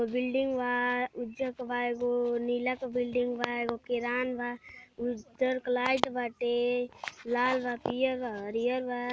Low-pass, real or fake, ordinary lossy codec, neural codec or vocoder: none; real; none; none